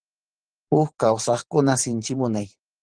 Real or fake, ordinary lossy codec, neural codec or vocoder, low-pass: fake; Opus, 16 kbps; vocoder, 44.1 kHz, 128 mel bands every 512 samples, BigVGAN v2; 9.9 kHz